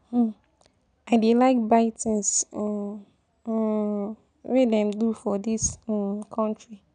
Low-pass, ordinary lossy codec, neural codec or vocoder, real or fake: 9.9 kHz; none; none; real